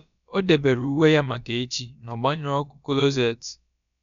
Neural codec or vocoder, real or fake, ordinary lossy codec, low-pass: codec, 16 kHz, about 1 kbps, DyCAST, with the encoder's durations; fake; none; 7.2 kHz